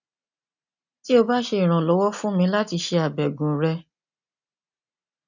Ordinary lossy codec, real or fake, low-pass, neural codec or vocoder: none; real; 7.2 kHz; none